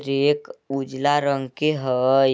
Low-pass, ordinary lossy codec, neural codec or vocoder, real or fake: none; none; none; real